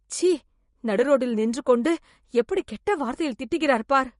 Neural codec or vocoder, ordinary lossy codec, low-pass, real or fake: vocoder, 24 kHz, 100 mel bands, Vocos; MP3, 48 kbps; 10.8 kHz; fake